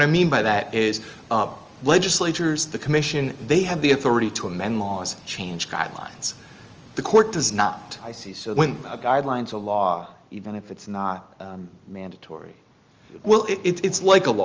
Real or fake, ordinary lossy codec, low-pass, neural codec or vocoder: real; Opus, 24 kbps; 7.2 kHz; none